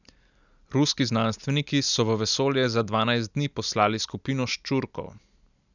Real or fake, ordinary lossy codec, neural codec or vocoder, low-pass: real; none; none; 7.2 kHz